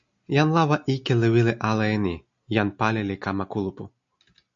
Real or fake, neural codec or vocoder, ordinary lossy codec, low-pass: real; none; MP3, 48 kbps; 7.2 kHz